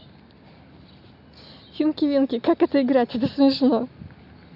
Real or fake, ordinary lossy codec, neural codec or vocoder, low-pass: real; none; none; 5.4 kHz